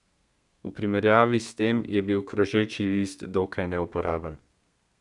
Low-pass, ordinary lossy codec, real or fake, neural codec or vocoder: 10.8 kHz; none; fake; codec, 32 kHz, 1.9 kbps, SNAC